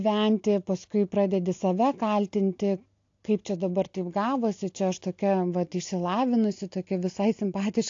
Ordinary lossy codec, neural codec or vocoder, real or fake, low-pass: AAC, 48 kbps; none; real; 7.2 kHz